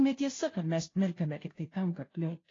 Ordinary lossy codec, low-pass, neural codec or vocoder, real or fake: AAC, 32 kbps; 7.2 kHz; codec, 16 kHz, 0.5 kbps, FunCodec, trained on Chinese and English, 25 frames a second; fake